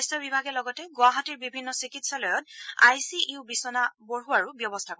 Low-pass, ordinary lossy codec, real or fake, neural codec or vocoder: none; none; real; none